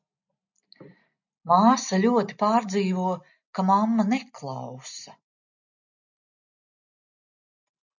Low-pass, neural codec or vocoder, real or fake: 7.2 kHz; none; real